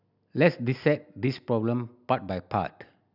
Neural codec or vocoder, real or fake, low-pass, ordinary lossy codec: none; real; 5.4 kHz; none